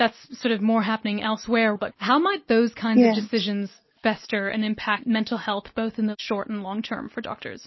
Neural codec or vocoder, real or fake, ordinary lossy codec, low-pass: none; real; MP3, 24 kbps; 7.2 kHz